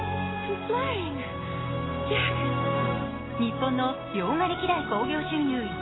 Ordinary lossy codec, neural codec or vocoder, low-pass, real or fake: AAC, 16 kbps; none; 7.2 kHz; real